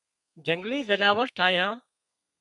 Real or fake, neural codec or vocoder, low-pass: fake; codec, 32 kHz, 1.9 kbps, SNAC; 10.8 kHz